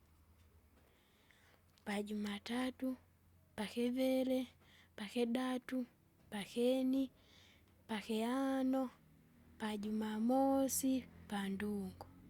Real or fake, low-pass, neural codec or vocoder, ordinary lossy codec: real; 19.8 kHz; none; Opus, 64 kbps